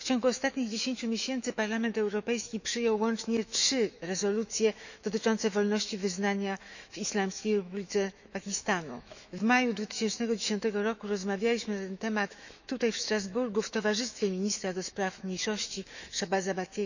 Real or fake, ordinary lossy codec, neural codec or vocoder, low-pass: fake; none; autoencoder, 48 kHz, 128 numbers a frame, DAC-VAE, trained on Japanese speech; 7.2 kHz